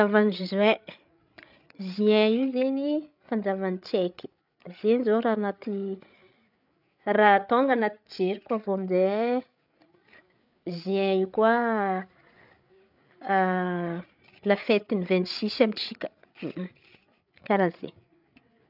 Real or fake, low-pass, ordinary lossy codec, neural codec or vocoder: fake; 5.4 kHz; none; codec, 16 kHz, 8 kbps, FreqCodec, larger model